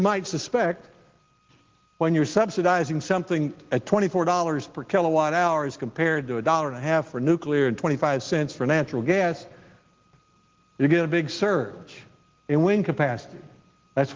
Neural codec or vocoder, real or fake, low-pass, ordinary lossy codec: none; real; 7.2 kHz; Opus, 16 kbps